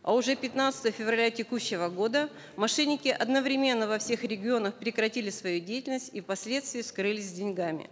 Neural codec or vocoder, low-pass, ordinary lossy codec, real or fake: none; none; none; real